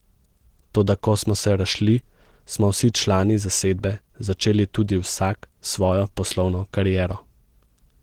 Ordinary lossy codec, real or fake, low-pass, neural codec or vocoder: Opus, 16 kbps; real; 19.8 kHz; none